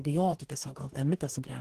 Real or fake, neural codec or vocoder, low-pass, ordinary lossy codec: fake; codec, 44.1 kHz, 2.6 kbps, DAC; 14.4 kHz; Opus, 16 kbps